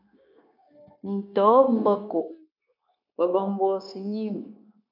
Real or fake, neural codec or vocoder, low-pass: fake; codec, 16 kHz, 0.9 kbps, LongCat-Audio-Codec; 5.4 kHz